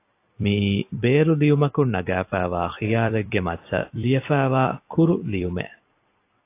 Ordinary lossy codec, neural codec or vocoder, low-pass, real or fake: AAC, 24 kbps; vocoder, 44.1 kHz, 128 mel bands every 256 samples, BigVGAN v2; 3.6 kHz; fake